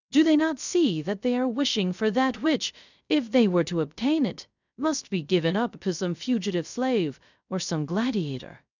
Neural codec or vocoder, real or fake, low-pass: codec, 16 kHz, 0.3 kbps, FocalCodec; fake; 7.2 kHz